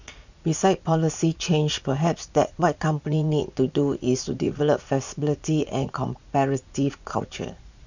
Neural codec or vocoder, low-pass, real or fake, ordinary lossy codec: vocoder, 44.1 kHz, 80 mel bands, Vocos; 7.2 kHz; fake; none